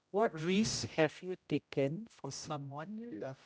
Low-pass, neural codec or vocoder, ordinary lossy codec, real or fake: none; codec, 16 kHz, 0.5 kbps, X-Codec, HuBERT features, trained on general audio; none; fake